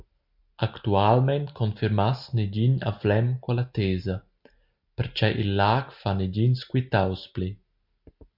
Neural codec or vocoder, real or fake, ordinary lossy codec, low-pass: none; real; MP3, 48 kbps; 5.4 kHz